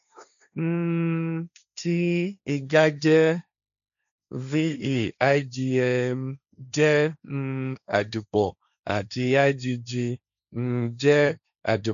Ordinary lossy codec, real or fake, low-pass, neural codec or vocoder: none; fake; 7.2 kHz; codec, 16 kHz, 1.1 kbps, Voila-Tokenizer